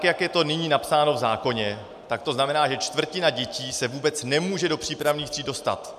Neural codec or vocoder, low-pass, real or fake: none; 14.4 kHz; real